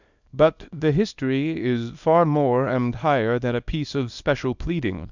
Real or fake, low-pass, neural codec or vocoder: fake; 7.2 kHz; codec, 24 kHz, 0.9 kbps, WavTokenizer, small release